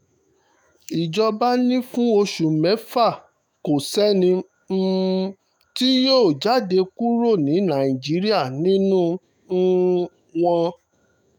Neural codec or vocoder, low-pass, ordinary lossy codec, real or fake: autoencoder, 48 kHz, 128 numbers a frame, DAC-VAE, trained on Japanese speech; none; none; fake